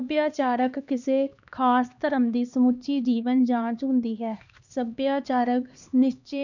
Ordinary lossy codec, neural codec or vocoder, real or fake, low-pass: none; codec, 16 kHz, 2 kbps, X-Codec, HuBERT features, trained on LibriSpeech; fake; 7.2 kHz